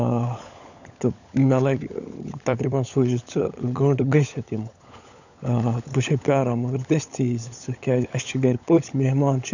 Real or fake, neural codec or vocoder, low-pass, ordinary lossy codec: fake; codec, 16 kHz, 16 kbps, FunCodec, trained on LibriTTS, 50 frames a second; 7.2 kHz; none